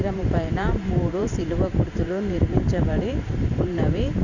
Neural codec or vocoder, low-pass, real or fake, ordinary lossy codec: vocoder, 44.1 kHz, 128 mel bands every 512 samples, BigVGAN v2; 7.2 kHz; fake; none